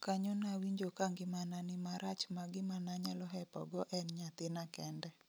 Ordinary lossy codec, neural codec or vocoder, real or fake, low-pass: none; none; real; none